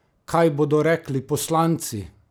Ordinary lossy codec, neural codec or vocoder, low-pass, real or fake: none; none; none; real